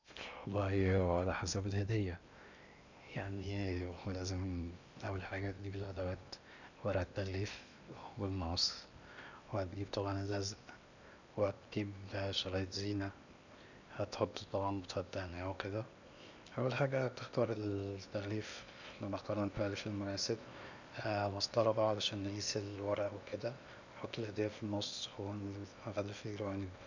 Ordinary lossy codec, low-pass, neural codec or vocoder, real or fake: none; 7.2 kHz; codec, 16 kHz in and 24 kHz out, 0.8 kbps, FocalCodec, streaming, 65536 codes; fake